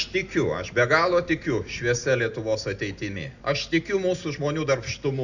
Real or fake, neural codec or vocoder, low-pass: real; none; 7.2 kHz